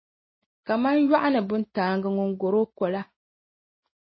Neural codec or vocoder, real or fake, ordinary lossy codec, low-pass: none; real; MP3, 24 kbps; 7.2 kHz